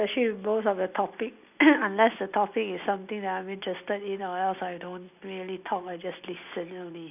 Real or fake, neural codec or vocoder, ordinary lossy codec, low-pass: real; none; none; 3.6 kHz